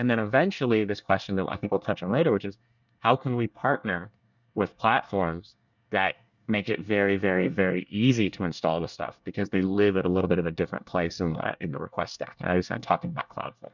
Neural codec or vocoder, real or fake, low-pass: codec, 24 kHz, 1 kbps, SNAC; fake; 7.2 kHz